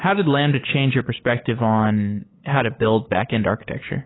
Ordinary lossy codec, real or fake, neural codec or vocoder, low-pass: AAC, 16 kbps; fake; codec, 16 kHz, 8 kbps, FunCodec, trained on LibriTTS, 25 frames a second; 7.2 kHz